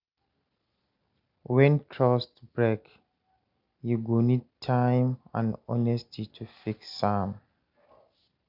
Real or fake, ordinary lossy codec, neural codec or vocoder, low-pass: real; Opus, 64 kbps; none; 5.4 kHz